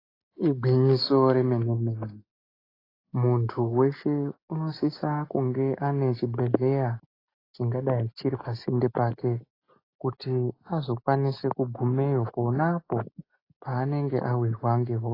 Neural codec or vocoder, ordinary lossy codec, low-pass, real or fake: none; AAC, 24 kbps; 5.4 kHz; real